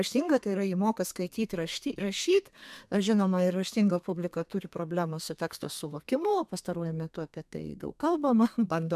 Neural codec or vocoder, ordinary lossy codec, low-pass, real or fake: codec, 32 kHz, 1.9 kbps, SNAC; MP3, 96 kbps; 14.4 kHz; fake